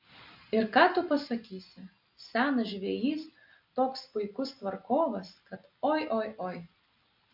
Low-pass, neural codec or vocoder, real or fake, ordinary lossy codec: 5.4 kHz; none; real; MP3, 48 kbps